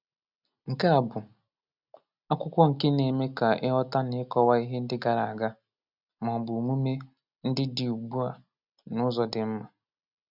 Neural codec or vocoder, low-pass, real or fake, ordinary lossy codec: none; 5.4 kHz; real; none